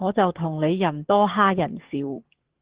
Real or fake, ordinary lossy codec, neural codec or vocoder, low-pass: fake; Opus, 16 kbps; codec, 44.1 kHz, 7.8 kbps, DAC; 3.6 kHz